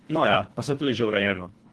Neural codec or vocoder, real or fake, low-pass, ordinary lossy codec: codec, 24 kHz, 1.5 kbps, HILCodec; fake; 10.8 kHz; Opus, 16 kbps